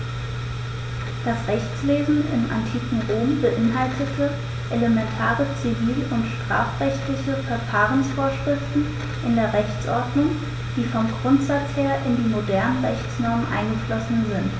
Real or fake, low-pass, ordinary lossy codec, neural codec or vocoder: real; none; none; none